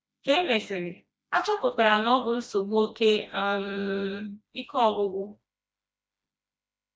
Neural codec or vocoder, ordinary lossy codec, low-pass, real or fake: codec, 16 kHz, 1 kbps, FreqCodec, smaller model; none; none; fake